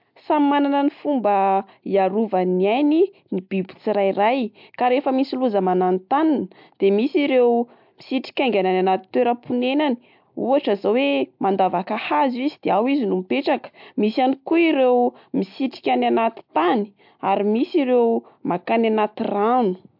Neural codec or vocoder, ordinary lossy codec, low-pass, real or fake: none; MP3, 48 kbps; 5.4 kHz; real